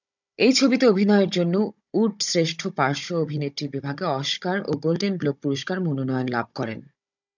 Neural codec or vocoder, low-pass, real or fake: codec, 16 kHz, 16 kbps, FunCodec, trained on Chinese and English, 50 frames a second; 7.2 kHz; fake